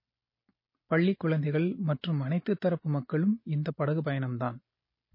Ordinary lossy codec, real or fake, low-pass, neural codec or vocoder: MP3, 24 kbps; real; 5.4 kHz; none